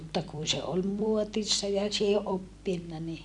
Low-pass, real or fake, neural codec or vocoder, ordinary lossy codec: 10.8 kHz; fake; vocoder, 44.1 kHz, 128 mel bands every 256 samples, BigVGAN v2; none